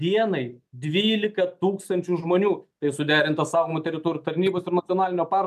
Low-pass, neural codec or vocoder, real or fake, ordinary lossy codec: 14.4 kHz; none; real; MP3, 96 kbps